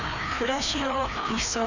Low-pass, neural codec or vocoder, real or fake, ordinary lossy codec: 7.2 kHz; codec, 16 kHz, 2 kbps, FreqCodec, larger model; fake; none